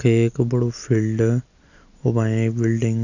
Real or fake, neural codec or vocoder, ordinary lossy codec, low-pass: real; none; none; 7.2 kHz